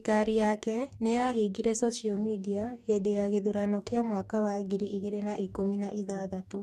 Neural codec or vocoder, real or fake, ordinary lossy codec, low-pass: codec, 44.1 kHz, 2.6 kbps, DAC; fake; none; 14.4 kHz